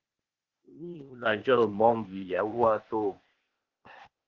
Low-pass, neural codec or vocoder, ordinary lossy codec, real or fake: 7.2 kHz; codec, 16 kHz, 0.8 kbps, ZipCodec; Opus, 32 kbps; fake